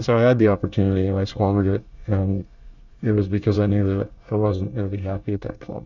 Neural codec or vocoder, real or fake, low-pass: codec, 24 kHz, 1 kbps, SNAC; fake; 7.2 kHz